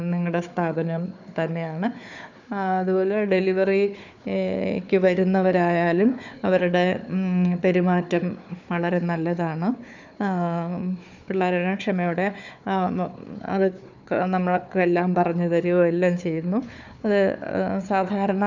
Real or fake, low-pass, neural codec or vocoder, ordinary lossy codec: fake; 7.2 kHz; codec, 16 kHz, 4 kbps, FunCodec, trained on Chinese and English, 50 frames a second; none